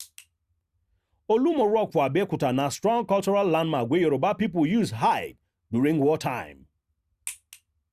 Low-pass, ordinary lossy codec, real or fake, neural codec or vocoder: 14.4 kHz; Opus, 64 kbps; real; none